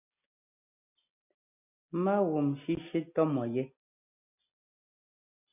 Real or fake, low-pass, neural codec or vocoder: real; 3.6 kHz; none